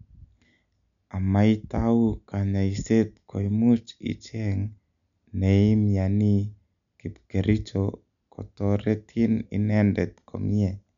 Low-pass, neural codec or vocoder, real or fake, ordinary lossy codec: 7.2 kHz; none; real; none